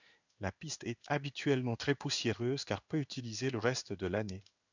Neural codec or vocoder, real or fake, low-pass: codec, 16 kHz in and 24 kHz out, 1 kbps, XY-Tokenizer; fake; 7.2 kHz